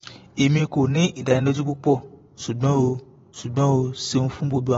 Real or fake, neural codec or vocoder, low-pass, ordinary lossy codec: fake; vocoder, 24 kHz, 100 mel bands, Vocos; 10.8 kHz; AAC, 24 kbps